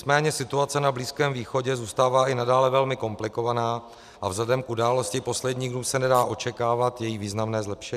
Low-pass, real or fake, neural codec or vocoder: 14.4 kHz; real; none